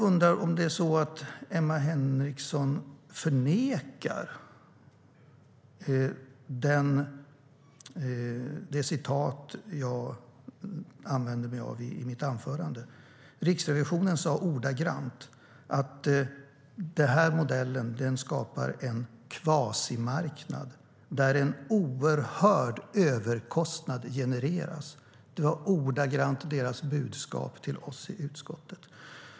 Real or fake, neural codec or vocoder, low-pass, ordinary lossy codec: real; none; none; none